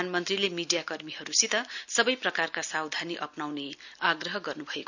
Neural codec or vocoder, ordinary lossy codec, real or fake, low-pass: none; none; real; 7.2 kHz